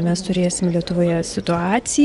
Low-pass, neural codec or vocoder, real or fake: 10.8 kHz; vocoder, 24 kHz, 100 mel bands, Vocos; fake